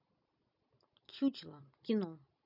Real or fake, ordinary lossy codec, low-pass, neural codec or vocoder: real; none; 5.4 kHz; none